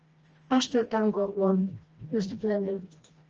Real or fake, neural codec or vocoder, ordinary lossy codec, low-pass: fake; codec, 16 kHz, 1 kbps, FreqCodec, smaller model; Opus, 24 kbps; 7.2 kHz